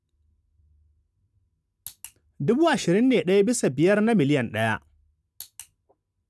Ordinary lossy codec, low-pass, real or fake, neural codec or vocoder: none; none; real; none